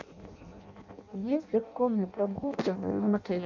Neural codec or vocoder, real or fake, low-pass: codec, 16 kHz in and 24 kHz out, 0.6 kbps, FireRedTTS-2 codec; fake; 7.2 kHz